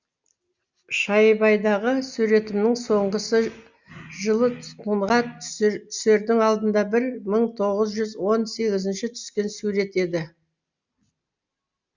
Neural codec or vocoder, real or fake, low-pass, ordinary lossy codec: none; real; 7.2 kHz; Opus, 64 kbps